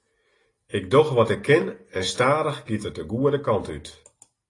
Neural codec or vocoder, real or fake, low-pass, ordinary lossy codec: none; real; 10.8 kHz; AAC, 32 kbps